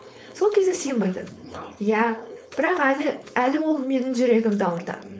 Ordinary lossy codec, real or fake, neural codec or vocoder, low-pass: none; fake; codec, 16 kHz, 4.8 kbps, FACodec; none